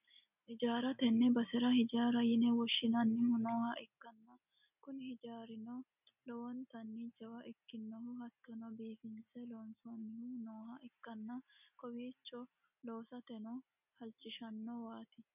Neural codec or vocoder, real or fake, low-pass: none; real; 3.6 kHz